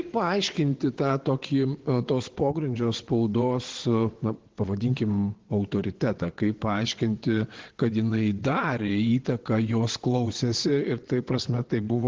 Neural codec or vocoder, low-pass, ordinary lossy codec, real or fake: vocoder, 22.05 kHz, 80 mel bands, WaveNeXt; 7.2 kHz; Opus, 16 kbps; fake